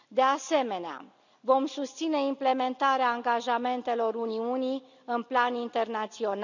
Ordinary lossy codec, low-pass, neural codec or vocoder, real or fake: none; 7.2 kHz; none; real